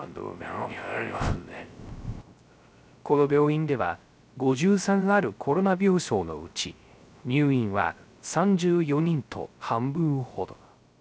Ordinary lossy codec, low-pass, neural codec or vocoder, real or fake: none; none; codec, 16 kHz, 0.3 kbps, FocalCodec; fake